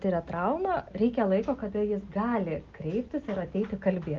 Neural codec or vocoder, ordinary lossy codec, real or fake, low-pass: none; Opus, 24 kbps; real; 7.2 kHz